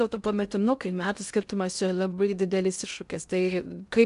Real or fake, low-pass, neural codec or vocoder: fake; 10.8 kHz; codec, 16 kHz in and 24 kHz out, 0.6 kbps, FocalCodec, streaming, 2048 codes